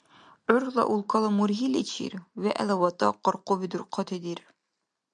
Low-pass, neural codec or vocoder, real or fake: 9.9 kHz; none; real